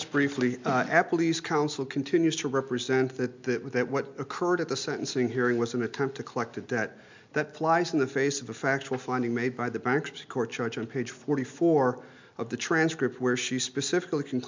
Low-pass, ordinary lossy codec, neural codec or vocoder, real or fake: 7.2 kHz; MP3, 64 kbps; none; real